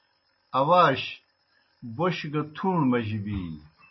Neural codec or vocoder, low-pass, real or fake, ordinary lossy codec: none; 7.2 kHz; real; MP3, 24 kbps